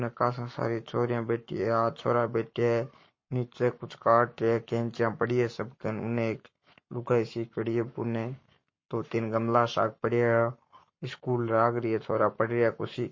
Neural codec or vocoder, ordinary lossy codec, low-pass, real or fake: codec, 44.1 kHz, 7.8 kbps, Pupu-Codec; MP3, 32 kbps; 7.2 kHz; fake